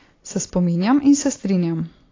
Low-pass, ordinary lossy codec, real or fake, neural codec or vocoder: 7.2 kHz; AAC, 32 kbps; real; none